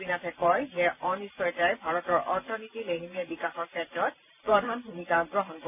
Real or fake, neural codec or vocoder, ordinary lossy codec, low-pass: fake; vocoder, 44.1 kHz, 128 mel bands every 512 samples, BigVGAN v2; none; 3.6 kHz